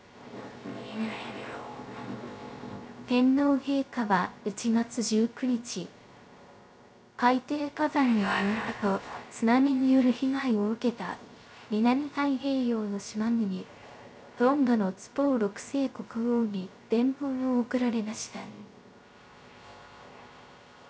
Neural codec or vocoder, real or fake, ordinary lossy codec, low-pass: codec, 16 kHz, 0.3 kbps, FocalCodec; fake; none; none